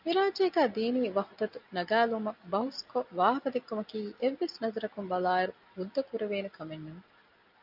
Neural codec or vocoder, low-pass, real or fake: none; 5.4 kHz; real